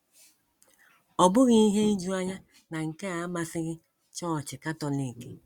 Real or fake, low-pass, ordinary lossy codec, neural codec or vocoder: real; 19.8 kHz; Opus, 64 kbps; none